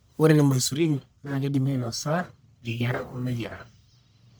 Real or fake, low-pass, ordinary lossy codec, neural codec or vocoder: fake; none; none; codec, 44.1 kHz, 1.7 kbps, Pupu-Codec